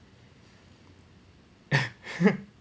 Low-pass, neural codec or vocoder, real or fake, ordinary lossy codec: none; none; real; none